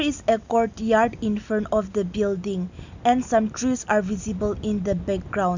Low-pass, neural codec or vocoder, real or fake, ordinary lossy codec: 7.2 kHz; none; real; none